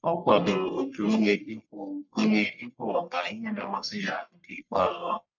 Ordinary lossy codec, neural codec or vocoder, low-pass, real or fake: none; codec, 44.1 kHz, 1.7 kbps, Pupu-Codec; 7.2 kHz; fake